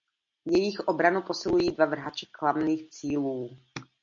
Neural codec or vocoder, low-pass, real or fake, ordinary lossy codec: none; 7.2 kHz; real; MP3, 48 kbps